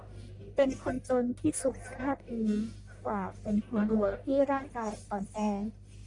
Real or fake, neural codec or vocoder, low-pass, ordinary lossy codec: fake; codec, 44.1 kHz, 1.7 kbps, Pupu-Codec; 10.8 kHz; MP3, 96 kbps